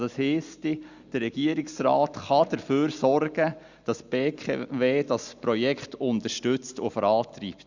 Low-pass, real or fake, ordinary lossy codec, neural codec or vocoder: 7.2 kHz; real; Opus, 64 kbps; none